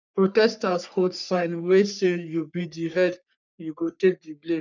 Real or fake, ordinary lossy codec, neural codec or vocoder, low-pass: fake; none; codec, 44.1 kHz, 3.4 kbps, Pupu-Codec; 7.2 kHz